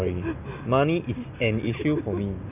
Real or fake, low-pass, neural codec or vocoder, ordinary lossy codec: real; 3.6 kHz; none; none